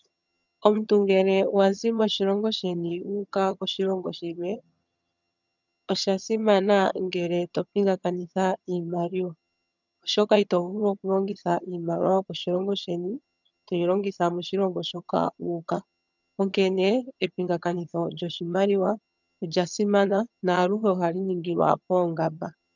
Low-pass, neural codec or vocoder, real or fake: 7.2 kHz; vocoder, 22.05 kHz, 80 mel bands, HiFi-GAN; fake